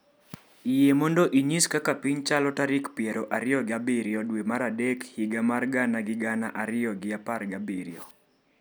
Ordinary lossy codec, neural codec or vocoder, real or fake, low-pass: none; none; real; none